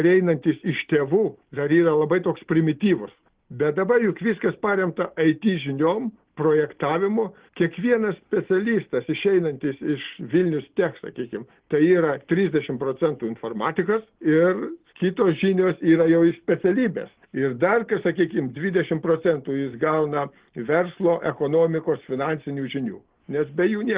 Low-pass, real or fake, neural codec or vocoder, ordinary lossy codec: 3.6 kHz; real; none; Opus, 16 kbps